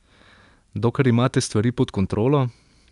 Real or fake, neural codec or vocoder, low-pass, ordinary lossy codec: real; none; 10.8 kHz; none